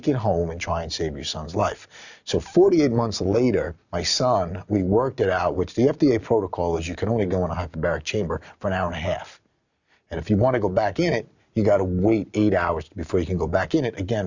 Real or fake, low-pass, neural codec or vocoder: real; 7.2 kHz; none